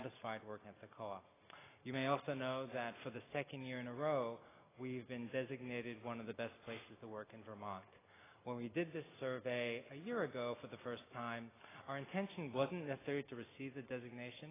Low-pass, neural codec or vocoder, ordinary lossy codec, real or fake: 3.6 kHz; none; AAC, 16 kbps; real